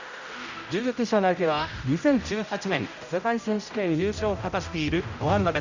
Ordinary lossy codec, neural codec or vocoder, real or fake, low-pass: none; codec, 16 kHz, 0.5 kbps, X-Codec, HuBERT features, trained on general audio; fake; 7.2 kHz